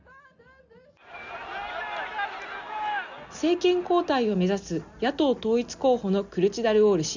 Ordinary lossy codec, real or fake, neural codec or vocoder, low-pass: none; real; none; 7.2 kHz